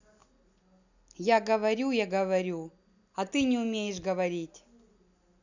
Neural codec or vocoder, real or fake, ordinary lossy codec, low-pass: none; real; none; 7.2 kHz